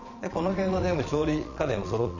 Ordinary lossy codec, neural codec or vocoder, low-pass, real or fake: none; vocoder, 22.05 kHz, 80 mel bands, Vocos; 7.2 kHz; fake